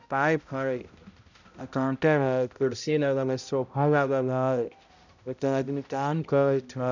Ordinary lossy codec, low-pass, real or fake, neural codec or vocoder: none; 7.2 kHz; fake; codec, 16 kHz, 0.5 kbps, X-Codec, HuBERT features, trained on balanced general audio